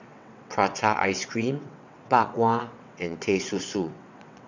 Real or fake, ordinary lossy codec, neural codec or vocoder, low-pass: fake; none; vocoder, 22.05 kHz, 80 mel bands, WaveNeXt; 7.2 kHz